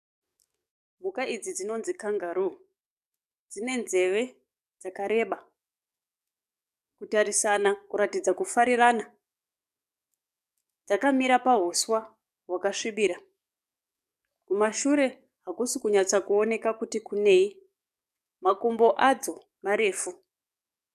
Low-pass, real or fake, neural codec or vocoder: 14.4 kHz; fake; codec, 44.1 kHz, 7.8 kbps, DAC